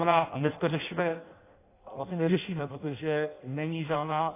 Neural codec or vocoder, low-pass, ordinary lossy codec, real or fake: codec, 16 kHz in and 24 kHz out, 0.6 kbps, FireRedTTS-2 codec; 3.6 kHz; AAC, 24 kbps; fake